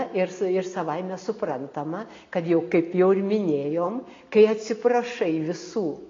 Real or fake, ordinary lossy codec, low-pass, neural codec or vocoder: real; AAC, 32 kbps; 7.2 kHz; none